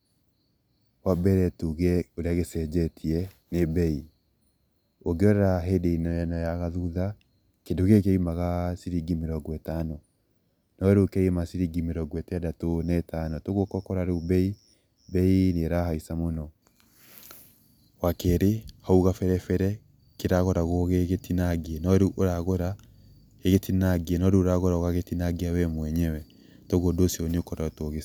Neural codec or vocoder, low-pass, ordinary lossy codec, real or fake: none; none; none; real